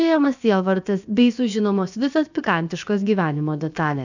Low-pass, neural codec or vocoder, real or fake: 7.2 kHz; codec, 16 kHz, about 1 kbps, DyCAST, with the encoder's durations; fake